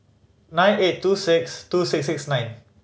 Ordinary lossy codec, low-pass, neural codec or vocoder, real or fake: none; none; none; real